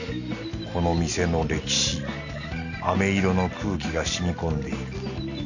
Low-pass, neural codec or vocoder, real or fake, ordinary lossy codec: 7.2 kHz; none; real; none